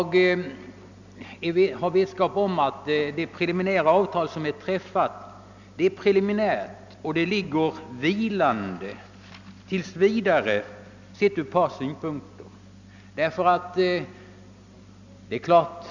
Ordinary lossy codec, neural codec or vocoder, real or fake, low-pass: none; none; real; 7.2 kHz